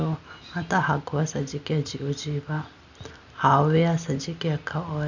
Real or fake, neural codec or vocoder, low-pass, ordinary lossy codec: real; none; 7.2 kHz; none